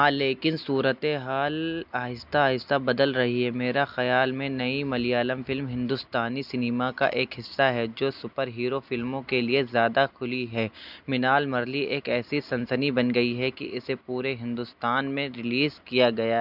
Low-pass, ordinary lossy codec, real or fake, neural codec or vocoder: 5.4 kHz; none; real; none